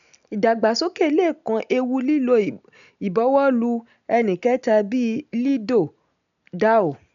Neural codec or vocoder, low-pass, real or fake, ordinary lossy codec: none; 7.2 kHz; real; none